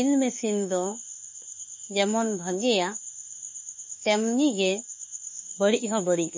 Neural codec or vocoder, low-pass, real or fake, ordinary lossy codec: autoencoder, 48 kHz, 32 numbers a frame, DAC-VAE, trained on Japanese speech; 7.2 kHz; fake; MP3, 32 kbps